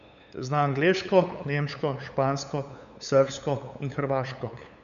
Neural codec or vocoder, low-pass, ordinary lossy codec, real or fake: codec, 16 kHz, 8 kbps, FunCodec, trained on LibriTTS, 25 frames a second; 7.2 kHz; none; fake